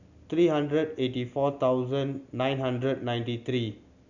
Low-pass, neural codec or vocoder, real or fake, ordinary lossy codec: 7.2 kHz; none; real; none